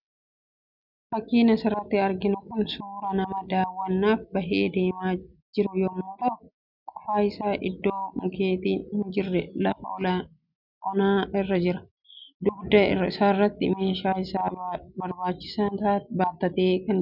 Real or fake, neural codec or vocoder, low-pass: real; none; 5.4 kHz